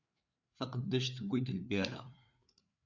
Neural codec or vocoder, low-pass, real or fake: codec, 16 kHz, 4 kbps, FreqCodec, larger model; 7.2 kHz; fake